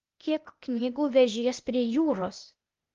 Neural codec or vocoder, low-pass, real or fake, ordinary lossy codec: codec, 16 kHz, 0.8 kbps, ZipCodec; 7.2 kHz; fake; Opus, 32 kbps